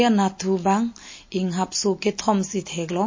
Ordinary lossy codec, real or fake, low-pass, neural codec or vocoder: MP3, 32 kbps; real; 7.2 kHz; none